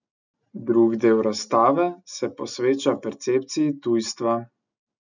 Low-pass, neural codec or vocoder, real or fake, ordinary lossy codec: 7.2 kHz; none; real; none